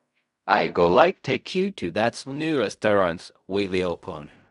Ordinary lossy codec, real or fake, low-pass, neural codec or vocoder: none; fake; 10.8 kHz; codec, 16 kHz in and 24 kHz out, 0.4 kbps, LongCat-Audio-Codec, fine tuned four codebook decoder